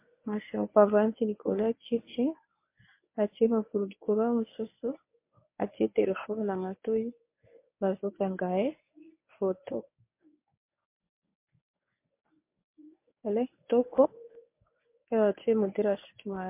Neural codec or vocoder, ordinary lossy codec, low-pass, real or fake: codec, 24 kHz, 0.9 kbps, WavTokenizer, medium speech release version 1; MP3, 24 kbps; 3.6 kHz; fake